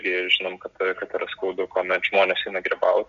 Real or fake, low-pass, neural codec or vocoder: real; 7.2 kHz; none